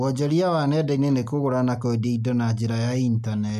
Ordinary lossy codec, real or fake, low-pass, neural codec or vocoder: none; real; 14.4 kHz; none